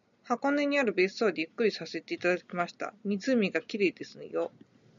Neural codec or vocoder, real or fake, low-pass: none; real; 7.2 kHz